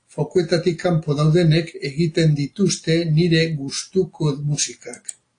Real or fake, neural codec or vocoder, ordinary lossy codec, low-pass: real; none; AAC, 48 kbps; 9.9 kHz